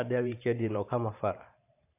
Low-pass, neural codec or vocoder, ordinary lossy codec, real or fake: 3.6 kHz; vocoder, 44.1 kHz, 128 mel bands every 512 samples, BigVGAN v2; AAC, 24 kbps; fake